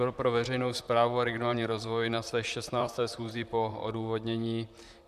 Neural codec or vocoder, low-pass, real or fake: vocoder, 44.1 kHz, 128 mel bands, Pupu-Vocoder; 14.4 kHz; fake